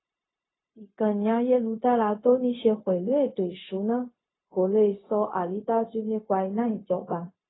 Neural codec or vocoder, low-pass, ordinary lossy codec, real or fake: codec, 16 kHz, 0.4 kbps, LongCat-Audio-Codec; 7.2 kHz; AAC, 16 kbps; fake